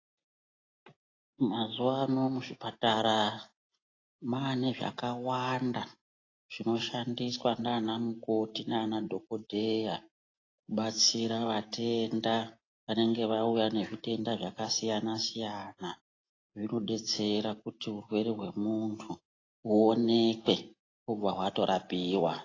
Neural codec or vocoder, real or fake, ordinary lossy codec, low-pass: none; real; AAC, 32 kbps; 7.2 kHz